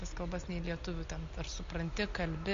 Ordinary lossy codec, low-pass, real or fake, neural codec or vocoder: MP3, 48 kbps; 7.2 kHz; real; none